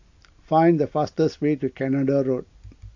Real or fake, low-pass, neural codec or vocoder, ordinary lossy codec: real; 7.2 kHz; none; AAC, 48 kbps